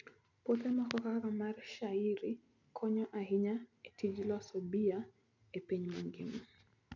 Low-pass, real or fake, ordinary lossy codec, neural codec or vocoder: 7.2 kHz; real; none; none